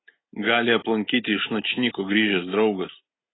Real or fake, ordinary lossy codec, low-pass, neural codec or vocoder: real; AAC, 16 kbps; 7.2 kHz; none